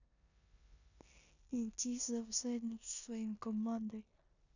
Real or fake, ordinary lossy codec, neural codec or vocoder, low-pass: fake; none; codec, 16 kHz in and 24 kHz out, 0.9 kbps, LongCat-Audio-Codec, four codebook decoder; 7.2 kHz